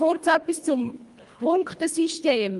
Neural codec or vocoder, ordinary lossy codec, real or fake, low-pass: codec, 24 kHz, 1.5 kbps, HILCodec; Opus, 32 kbps; fake; 10.8 kHz